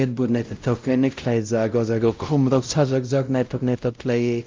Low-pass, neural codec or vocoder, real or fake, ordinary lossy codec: 7.2 kHz; codec, 16 kHz, 0.5 kbps, X-Codec, WavLM features, trained on Multilingual LibriSpeech; fake; Opus, 24 kbps